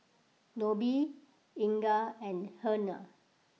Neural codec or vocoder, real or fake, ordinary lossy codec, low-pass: none; real; none; none